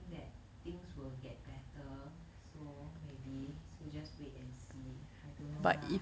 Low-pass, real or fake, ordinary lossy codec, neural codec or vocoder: none; real; none; none